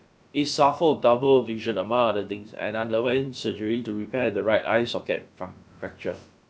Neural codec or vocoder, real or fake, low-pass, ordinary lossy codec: codec, 16 kHz, about 1 kbps, DyCAST, with the encoder's durations; fake; none; none